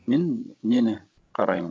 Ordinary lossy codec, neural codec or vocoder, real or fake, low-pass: none; codec, 16 kHz, 16 kbps, FreqCodec, larger model; fake; none